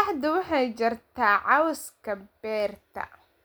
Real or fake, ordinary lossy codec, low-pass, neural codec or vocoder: real; none; none; none